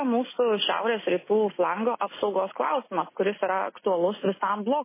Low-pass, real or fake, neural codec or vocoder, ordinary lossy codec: 3.6 kHz; real; none; MP3, 16 kbps